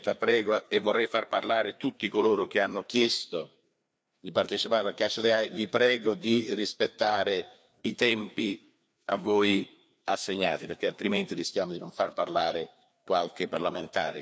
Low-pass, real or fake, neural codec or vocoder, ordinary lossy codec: none; fake; codec, 16 kHz, 2 kbps, FreqCodec, larger model; none